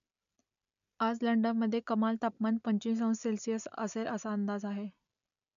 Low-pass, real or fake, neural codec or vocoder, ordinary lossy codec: 7.2 kHz; real; none; none